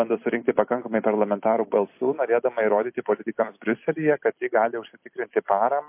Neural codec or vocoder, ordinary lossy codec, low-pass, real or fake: vocoder, 44.1 kHz, 128 mel bands every 256 samples, BigVGAN v2; MP3, 24 kbps; 3.6 kHz; fake